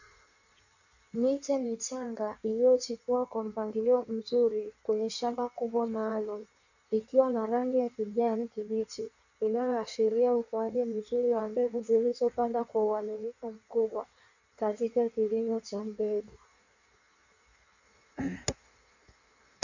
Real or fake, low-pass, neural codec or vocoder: fake; 7.2 kHz; codec, 16 kHz in and 24 kHz out, 1.1 kbps, FireRedTTS-2 codec